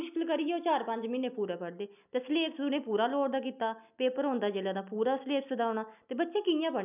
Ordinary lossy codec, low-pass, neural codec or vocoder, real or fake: none; 3.6 kHz; none; real